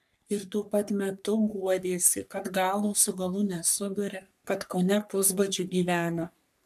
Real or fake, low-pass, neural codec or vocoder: fake; 14.4 kHz; codec, 44.1 kHz, 3.4 kbps, Pupu-Codec